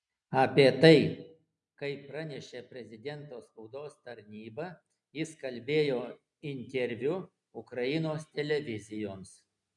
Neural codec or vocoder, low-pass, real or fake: none; 10.8 kHz; real